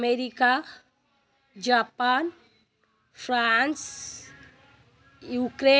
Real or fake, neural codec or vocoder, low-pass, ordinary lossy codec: real; none; none; none